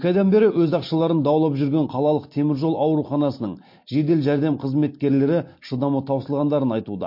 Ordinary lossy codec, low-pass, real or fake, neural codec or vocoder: MP3, 32 kbps; 5.4 kHz; real; none